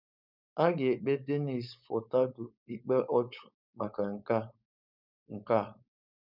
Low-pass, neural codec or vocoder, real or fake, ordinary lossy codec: 5.4 kHz; codec, 16 kHz, 4.8 kbps, FACodec; fake; none